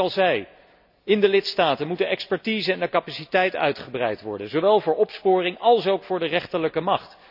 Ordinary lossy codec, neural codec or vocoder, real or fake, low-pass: none; none; real; 5.4 kHz